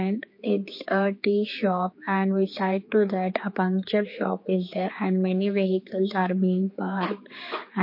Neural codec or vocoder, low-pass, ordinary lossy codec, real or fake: codec, 16 kHz, 4 kbps, X-Codec, HuBERT features, trained on general audio; 5.4 kHz; MP3, 32 kbps; fake